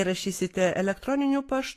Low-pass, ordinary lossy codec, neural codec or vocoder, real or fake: 14.4 kHz; AAC, 48 kbps; autoencoder, 48 kHz, 128 numbers a frame, DAC-VAE, trained on Japanese speech; fake